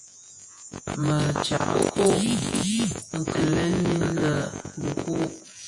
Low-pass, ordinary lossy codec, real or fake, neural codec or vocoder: 10.8 kHz; AAC, 64 kbps; fake; vocoder, 48 kHz, 128 mel bands, Vocos